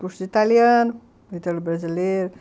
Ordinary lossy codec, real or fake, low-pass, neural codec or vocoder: none; real; none; none